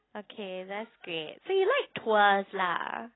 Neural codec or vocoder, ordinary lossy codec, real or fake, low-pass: none; AAC, 16 kbps; real; 7.2 kHz